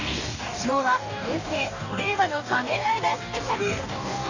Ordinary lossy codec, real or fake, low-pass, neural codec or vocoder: none; fake; 7.2 kHz; codec, 44.1 kHz, 2.6 kbps, DAC